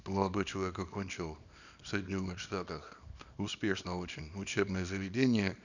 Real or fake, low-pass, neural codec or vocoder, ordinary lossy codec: fake; 7.2 kHz; codec, 24 kHz, 0.9 kbps, WavTokenizer, small release; none